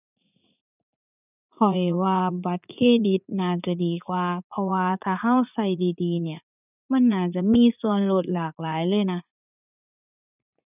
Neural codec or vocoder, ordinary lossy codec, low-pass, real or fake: vocoder, 44.1 kHz, 80 mel bands, Vocos; none; 3.6 kHz; fake